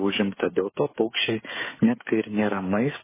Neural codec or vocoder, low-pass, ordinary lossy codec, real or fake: codec, 16 kHz, 8 kbps, FreqCodec, smaller model; 3.6 kHz; MP3, 16 kbps; fake